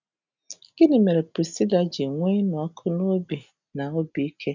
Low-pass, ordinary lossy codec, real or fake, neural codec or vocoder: 7.2 kHz; none; real; none